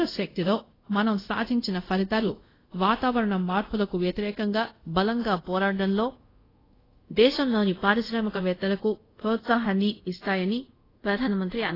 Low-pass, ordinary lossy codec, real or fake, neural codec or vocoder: 5.4 kHz; AAC, 24 kbps; fake; codec, 24 kHz, 0.5 kbps, DualCodec